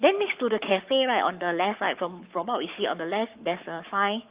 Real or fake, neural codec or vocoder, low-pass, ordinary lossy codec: fake; codec, 44.1 kHz, 7.8 kbps, Pupu-Codec; 3.6 kHz; Opus, 32 kbps